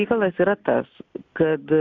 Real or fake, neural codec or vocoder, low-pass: real; none; 7.2 kHz